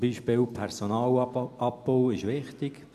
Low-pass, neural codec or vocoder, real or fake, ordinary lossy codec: 14.4 kHz; none; real; none